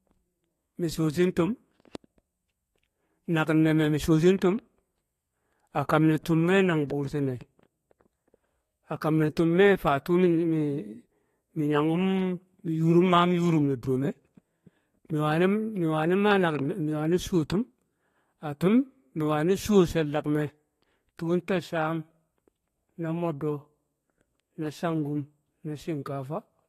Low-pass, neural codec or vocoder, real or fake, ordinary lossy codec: 14.4 kHz; codec, 32 kHz, 1.9 kbps, SNAC; fake; AAC, 48 kbps